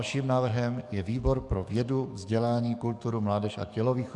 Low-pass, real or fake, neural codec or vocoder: 10.8 kHz; fake; codec, 44.1 kHz, 7.8 kbps, DAC